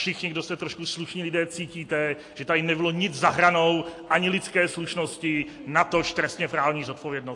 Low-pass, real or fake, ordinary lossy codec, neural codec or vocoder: 10.8 kHz; real; AAC, 48 kbps; none